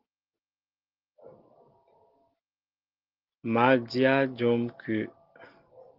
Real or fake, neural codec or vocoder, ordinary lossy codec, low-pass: real; none; Opus, 16 kbps; 5.4 kHz